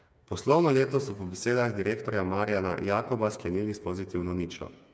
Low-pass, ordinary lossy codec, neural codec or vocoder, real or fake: none; none; codec, 16 kHz, 4 kbps, FreqCodec, smaller model; fake